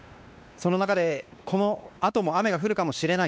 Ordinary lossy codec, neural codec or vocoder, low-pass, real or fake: none; codec, 16 kHz, 2 kbps, X-Codec, WavLM features, trained on Multilingual LibriSpeech; none; fake